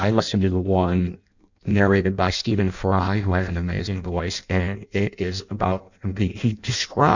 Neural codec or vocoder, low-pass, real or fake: codec, 16 kHz in and 24 kHz out, 0.6 kbps, FireRedTTS-2 codec; 7.2 kHz; fake